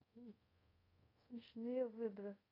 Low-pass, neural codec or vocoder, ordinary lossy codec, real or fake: 5.4 kHz; codec, 24 kHz, 0.5 kbps, DualCodec; AAC, 24 kbps; fake